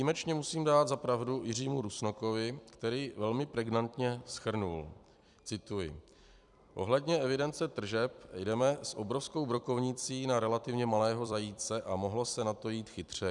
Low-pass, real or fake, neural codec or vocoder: 10.8 kHz; fake; vocoder, 44.1 kHz, 128 mel bands every 256 samples, BigVGAN v2